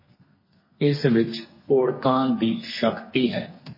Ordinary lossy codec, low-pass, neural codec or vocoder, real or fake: MP3, 24 kbps; 5.4 kHz; codec, 32 kHz, 1.9 kbps, SNAC; fake